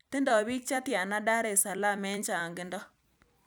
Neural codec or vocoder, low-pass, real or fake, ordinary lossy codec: vocoder, 44.1 kHz, 128 mel bands every 256 samples, BigVGAN v2; none; fake; none